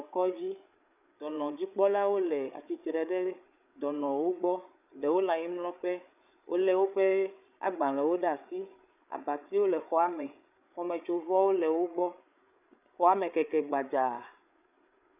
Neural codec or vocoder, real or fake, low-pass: codec, 16 kHz, 8 kbps, FreqCodec, larger model; fake; 3.6 kHz